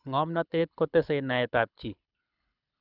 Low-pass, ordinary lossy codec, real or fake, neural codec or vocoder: 5.4 kHz; none; fake; codec, 24 kHz, 6 kbps, HILCodec